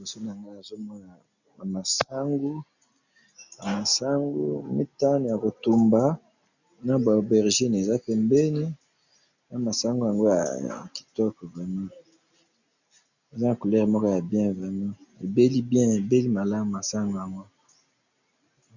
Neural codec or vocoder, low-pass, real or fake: none; 7.2 kHz; real